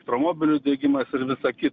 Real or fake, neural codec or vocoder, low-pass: real; none; 7.2 kHz